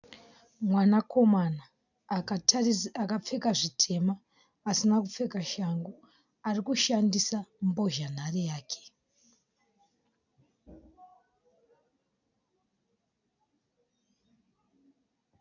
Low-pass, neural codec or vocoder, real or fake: 7.2 kHz; none; real